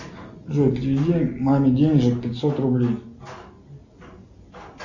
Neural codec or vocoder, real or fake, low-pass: none; real; 7.2 kHz